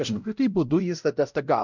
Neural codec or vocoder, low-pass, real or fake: codec, 16 kHz, 0.5 kbps, X-Codec, WavLM features, trained on Multilingual LibriSpeech; 7.2 kHz; fake